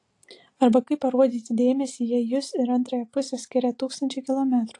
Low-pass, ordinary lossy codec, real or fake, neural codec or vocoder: 10.8 kHz; AAC, 48 kbps; real; none